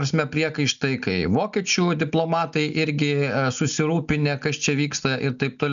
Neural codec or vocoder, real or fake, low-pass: none; real; 7.2 kHz